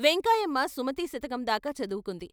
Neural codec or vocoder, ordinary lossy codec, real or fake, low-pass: none; none; real; none